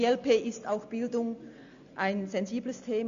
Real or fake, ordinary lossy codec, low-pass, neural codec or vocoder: real; Opus, 64 kbps; 7.2 kHz; none